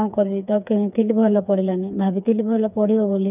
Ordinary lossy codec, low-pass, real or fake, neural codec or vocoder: none; 3.6 kHz; fake; codec, 16 kHz, 4 kbps, FreqCodec, smaller model